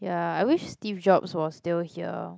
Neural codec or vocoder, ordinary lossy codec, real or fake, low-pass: none; none; real; none